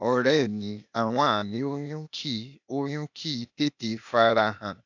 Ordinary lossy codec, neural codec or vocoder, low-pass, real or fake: none; codec, 16 kHz, 0.8 kbps, ZipCodec; 7.2 kHz; fake